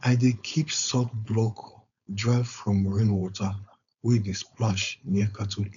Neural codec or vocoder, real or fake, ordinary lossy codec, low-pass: codec, 16 kHz, 4.8 kbps, FACodec; fake; none; 7.2 kHz